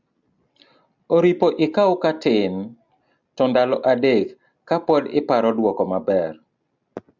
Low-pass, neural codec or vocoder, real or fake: 7.2 kHz; none; real